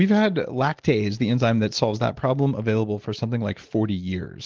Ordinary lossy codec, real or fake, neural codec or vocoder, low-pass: Opus, 16 kbps; real; none; 7.2 kHz